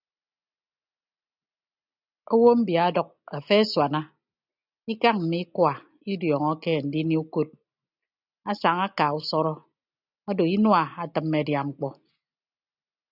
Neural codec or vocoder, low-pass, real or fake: none; 5.4 kHz; real